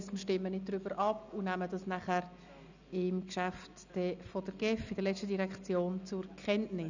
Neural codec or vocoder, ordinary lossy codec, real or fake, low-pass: none; none; real; 7.2 kHz